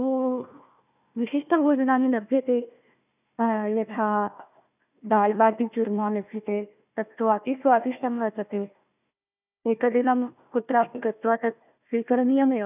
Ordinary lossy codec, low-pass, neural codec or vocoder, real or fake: none; 3.6 kHz; codec, 16 kHz, 1 kbps, FunCodec, trained on Chinese and English, 50 frames a second; fake